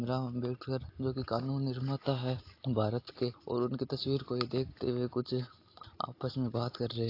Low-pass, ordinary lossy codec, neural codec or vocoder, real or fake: 5.4 kHz; AAC, 32 kbps; none; real